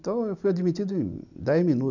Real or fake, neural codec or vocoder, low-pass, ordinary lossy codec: real; none; 7.2 kHz; none